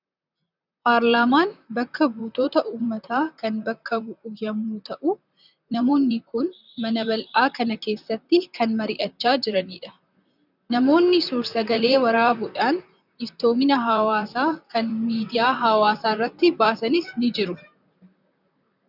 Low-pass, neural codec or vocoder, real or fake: 5.4 kHz; vocoder, 44.1 kHz, 128 mel bands, Pupu-Vocoder; fake